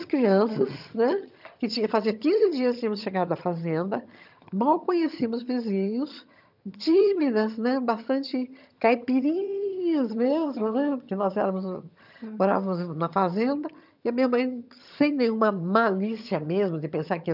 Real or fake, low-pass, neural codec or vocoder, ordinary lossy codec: fake; 5.4 kHz; vocoder, 22.05 kHz, 80 mel bands, HiFi-GAN; none